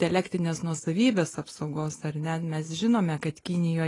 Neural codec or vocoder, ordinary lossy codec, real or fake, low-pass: none; AAC, 32 kbps; real; 10.8 kHz